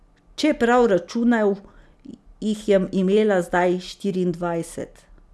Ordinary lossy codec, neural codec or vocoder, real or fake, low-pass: none; none; real; none